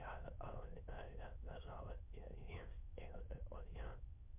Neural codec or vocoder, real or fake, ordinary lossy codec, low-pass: autoencoder, 22.05 kHz, a latent of 192 numbers a frame, VITS, trained on many speakers; fake; MP3, 32 kbps; 3.6 kHz